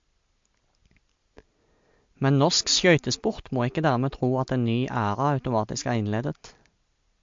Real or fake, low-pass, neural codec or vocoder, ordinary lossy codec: real; 7.2 kHz; none; MP3, 48 kbps